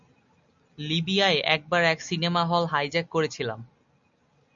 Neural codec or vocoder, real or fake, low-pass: none; real; 7.2 kHz